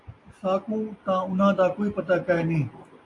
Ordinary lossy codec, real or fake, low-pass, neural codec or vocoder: AAC, 48 kbps; real; 10.8 kHz; none